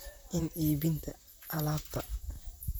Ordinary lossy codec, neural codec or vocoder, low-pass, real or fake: none; vocoder, 44.1 kHz, 128 mel bands, Pupu-Vocoder; none; fake